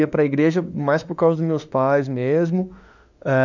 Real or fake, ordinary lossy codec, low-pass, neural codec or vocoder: fake; none; 7.2 kHz; autoencoder, 48 kHz, 32 numbers a frame, DAC-VAE, trained on Japanese speech